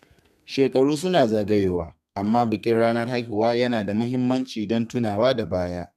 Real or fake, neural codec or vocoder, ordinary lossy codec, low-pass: fake; codec, 32 kHz, 1.9 kbps, SNAC; none; 14.4 kHz